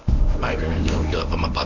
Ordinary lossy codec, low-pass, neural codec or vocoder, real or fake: none; 7.2 kHz; codec, 24 kHz, 0.9 kbps, WavTokenizer, medium speech release version 1; fake